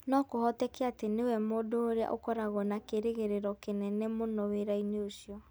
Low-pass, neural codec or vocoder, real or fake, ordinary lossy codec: none; none; real; none